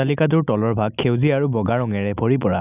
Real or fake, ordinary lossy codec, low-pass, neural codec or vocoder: real; none; 3.6 kHz; none